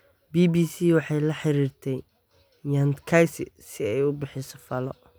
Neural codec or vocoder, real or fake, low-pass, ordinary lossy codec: none; real; none; none